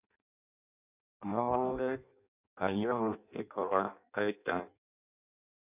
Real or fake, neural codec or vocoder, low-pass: fake; codec, 16 kHz in and 24 kHz out, 0.6 kbps, FireRedTTS-2 codec; 3.6 kHz